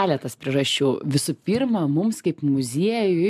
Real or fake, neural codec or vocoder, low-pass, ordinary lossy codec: real; none; 14.4 kHz; MP3, 96 kbps